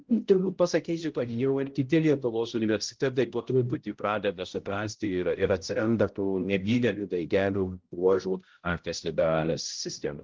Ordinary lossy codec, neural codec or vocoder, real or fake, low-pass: Opus, 16 kbps; codec, 16 kHz, 0.5 kbps, X-Codec, HuBERT features, trained on balanced general audio; fake; 7.2 kHz